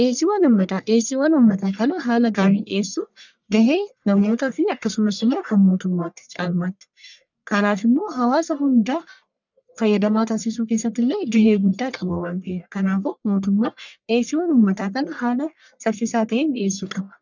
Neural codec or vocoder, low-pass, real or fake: codec, 44.1 kHz, 1.7 kbps, Pupu-Codec; 7.2 kHz; fake